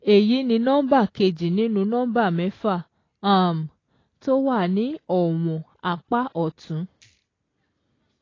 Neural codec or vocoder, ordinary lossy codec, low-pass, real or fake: none; AAC, 32 kbps; 7.2 kHz; real